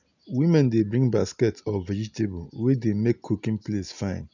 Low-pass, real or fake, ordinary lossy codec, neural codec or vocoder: 7.2 kHz; real; none; none